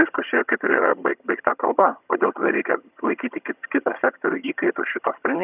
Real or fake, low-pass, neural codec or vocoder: fake; 3.6 kHz; vocoder, 22.05 kHz, 80 mel bands, HiFi-GAN